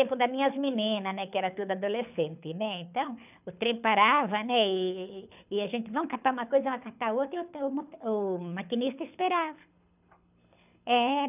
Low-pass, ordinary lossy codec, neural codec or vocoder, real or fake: 3.6 kHz; none; codec, 24 kHz, 6 kbps, HILCodec; fake